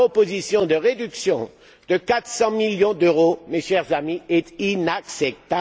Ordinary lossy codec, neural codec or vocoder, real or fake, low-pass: none; none; real; none